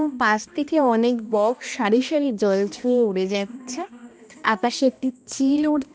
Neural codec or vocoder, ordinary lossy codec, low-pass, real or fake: codec, 16 kHz, 1 kbps, X-Codec, HuBERT features, trained on balanced general audio; none; none; fake